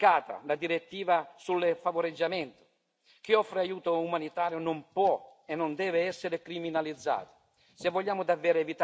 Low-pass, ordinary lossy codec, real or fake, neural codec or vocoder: none; none; real; none